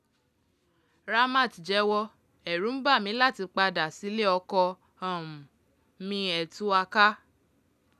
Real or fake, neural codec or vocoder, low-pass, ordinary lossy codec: real; none; 14.4 kHz; none